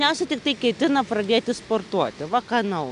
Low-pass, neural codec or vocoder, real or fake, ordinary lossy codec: 10.8 kHz; none; real; MP3, 96 kbps